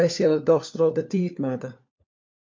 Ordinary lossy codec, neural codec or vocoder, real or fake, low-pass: MP3, 48 kbps; codec, 16 kHz, 4 kbps, FunCodec, trained on LibriTTS, 50 frames a second; fake; 7.2 kHz